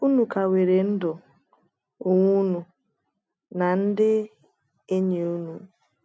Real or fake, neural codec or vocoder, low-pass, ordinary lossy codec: real; none; none; none